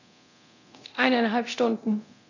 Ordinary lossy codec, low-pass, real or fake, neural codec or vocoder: none; 7.2 kHz; fake; codec, 24 kHz, 0.9 kbps, DualCodec